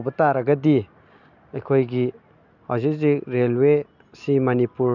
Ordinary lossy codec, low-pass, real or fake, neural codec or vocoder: none; 7.2 kHz; real; none